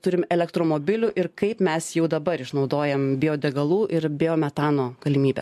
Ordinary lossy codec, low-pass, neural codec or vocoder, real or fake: MP3, 64 kbps; 14.4 kHz; none; real